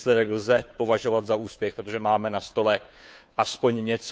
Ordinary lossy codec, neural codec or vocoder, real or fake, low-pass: none; codec, 16 kHz, 2 kbps, FunCodec, trained on Chinese and English, 25 frames a second; fake; none